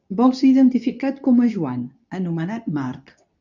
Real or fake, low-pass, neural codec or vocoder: fake; 7.2 kHz; codec, 24 kHz, 0.9 kbps, WavTokenizer, medium speech release version 2